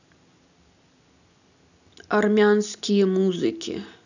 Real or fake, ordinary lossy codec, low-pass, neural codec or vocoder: real; none; 7.2 kHz; none